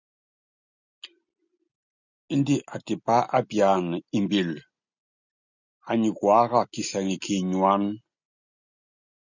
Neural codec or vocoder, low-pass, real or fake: none; 7.2 kHz; real